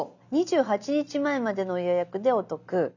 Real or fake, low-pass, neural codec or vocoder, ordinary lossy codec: real; 7.2 kHz; none; none